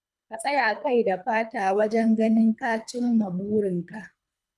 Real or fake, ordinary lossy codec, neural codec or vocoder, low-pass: fake; none; codec, 24 kHz, 3 kbps, HILCodec; none